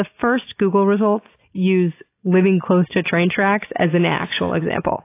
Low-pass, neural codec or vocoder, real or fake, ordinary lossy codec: 3.6 kHz; none; real; AAC, 24 kbps